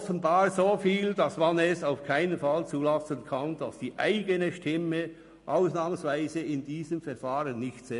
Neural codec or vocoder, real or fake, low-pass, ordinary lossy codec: none; real; 10.8 kHz; MP3, 48 kbps